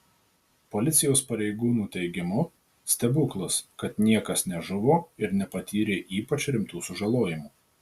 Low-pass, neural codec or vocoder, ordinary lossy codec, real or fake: 14.4 kHz; none; Opus, 64 kbps; real